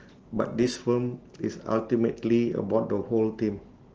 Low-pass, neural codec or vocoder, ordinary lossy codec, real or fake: 7.2 kHz; none; Opus, 16 kbps; real